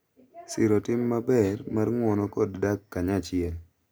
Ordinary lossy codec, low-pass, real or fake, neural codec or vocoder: none; none; real; none